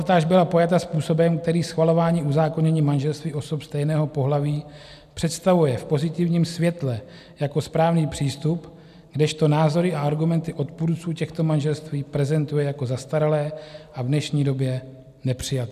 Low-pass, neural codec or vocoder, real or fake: 14.4 kHz; none; real